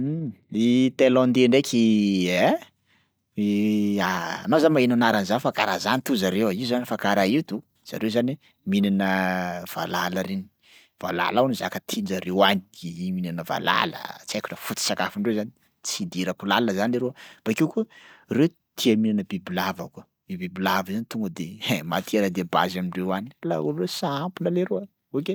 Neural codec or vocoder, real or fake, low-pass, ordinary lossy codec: none; real; none; none